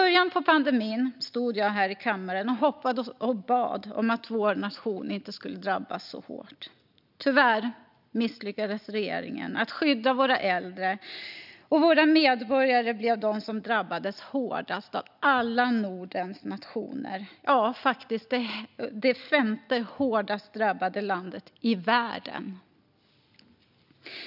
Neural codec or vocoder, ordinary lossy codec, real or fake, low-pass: none; none; real; 5.4 kHz